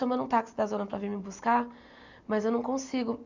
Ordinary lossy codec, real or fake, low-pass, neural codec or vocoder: none; real; 7.2 kHz; none